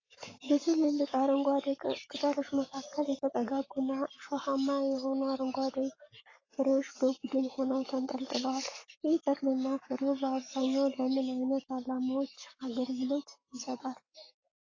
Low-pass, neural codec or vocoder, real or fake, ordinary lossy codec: 7.2 kHz; codec, 44.1 kHz, 7.8 kbps, Pupu-Codec; fake; AAC, 32 kbps